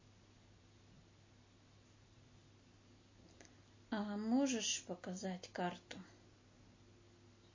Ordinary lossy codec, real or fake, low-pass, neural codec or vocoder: MP3, 32 kbps; real; 7.2 kHz; none